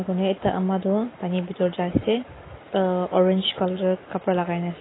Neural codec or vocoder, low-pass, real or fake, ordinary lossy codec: none; 7.2 kHz; real; AAC, 16 kbps